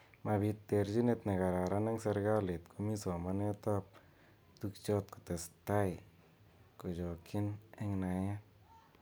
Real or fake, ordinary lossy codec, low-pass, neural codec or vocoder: real; none; none; none